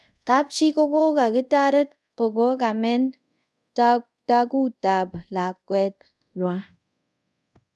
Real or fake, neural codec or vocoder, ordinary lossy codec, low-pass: fake; codec, 24 kHz, 0.5 kbps, DualCodec; none; none